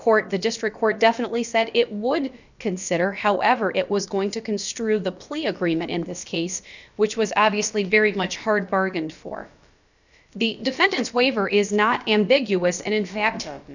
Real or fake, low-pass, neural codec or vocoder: fake; 7.2 kHz; codec, 16 kHz, about 1 kbps, DyCAST, with the encoder's durations